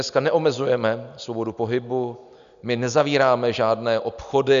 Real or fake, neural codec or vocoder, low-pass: real; none; 7.2 kHz